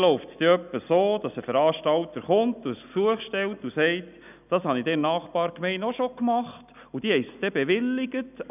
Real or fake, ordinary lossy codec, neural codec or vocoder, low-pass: real; none; none; 3.6 kHz